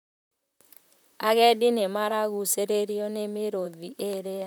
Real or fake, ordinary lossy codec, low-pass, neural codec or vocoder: fake; none; none; vocoder, 44.1 kHz, 128 mel bands, Pupu-Vocoder